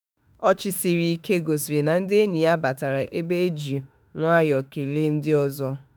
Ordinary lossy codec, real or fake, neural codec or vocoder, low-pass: none; fake; autoencoder, 48 kHz, 32 numbers a frame, DAC-VAE, trained on Japanese speech; none